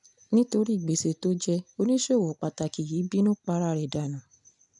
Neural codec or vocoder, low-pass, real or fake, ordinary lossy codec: none; 10.8 kHz; real; none